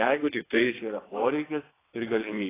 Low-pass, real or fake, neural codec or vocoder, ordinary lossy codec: 3.6 kHz; fake; vocoder, 22.05 kHz, 80 mel bands, WaveNeXt; AAC, 16 kbps